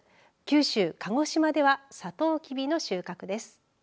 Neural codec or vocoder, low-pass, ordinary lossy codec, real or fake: none; none; none; real